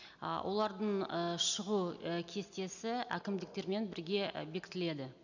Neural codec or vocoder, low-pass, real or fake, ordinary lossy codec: none; 7.2 kHz; real; none